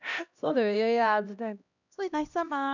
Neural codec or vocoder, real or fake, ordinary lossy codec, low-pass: codec, 16 kHz, 1 kbps, X-Codec, HuBERT features, trained on LibriSpeech; fake; none; 7.2 kHz